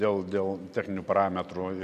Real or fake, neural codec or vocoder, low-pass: real; none; 14.4 kHz